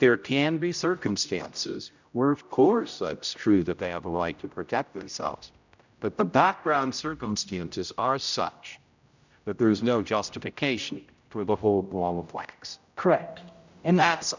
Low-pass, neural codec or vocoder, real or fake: 7.2 kHz; codec, 16 kHz, 0.5 kbps, X-Codec, HuBERT features, trained on general audio; fake